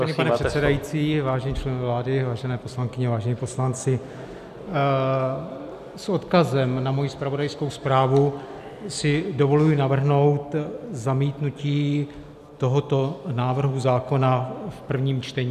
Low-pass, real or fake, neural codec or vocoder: 14.4 kHz; real; none